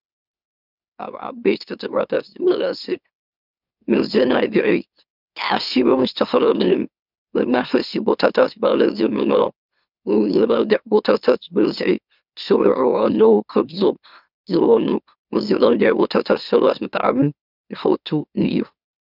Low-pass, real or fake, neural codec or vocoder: 5.4 kHz; fake; autoencoder, 44.1 kHz, a latent of 192 numbers a frame, MeloTTS